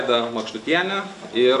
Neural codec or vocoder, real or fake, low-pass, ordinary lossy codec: none; real; 10.8 kHz; AAC, 96 kbps